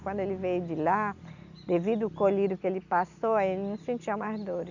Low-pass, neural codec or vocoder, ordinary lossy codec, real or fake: 7.2 kHz; none; none; real